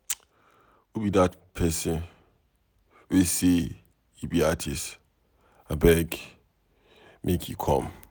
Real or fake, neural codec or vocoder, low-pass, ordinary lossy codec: real; none; none; none